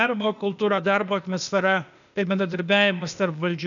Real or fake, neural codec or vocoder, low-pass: fake; codec, 16 kHz, 0.8 kbps, ZipCodec; 7.2 kHz